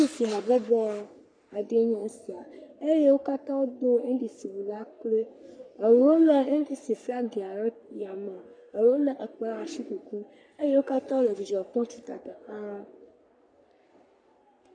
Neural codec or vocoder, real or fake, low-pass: codec, 44.1 kHz, 3.4 kbps, Pupu-Codec; fake; 9.9 kHz